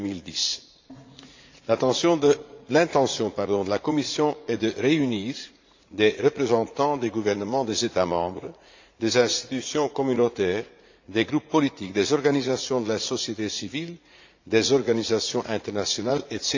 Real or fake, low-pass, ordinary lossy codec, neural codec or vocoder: fake; 7.2 kHz; AAC, 48 kbps; vocoder, 44.1 kHz, 80 mel bands, Vocos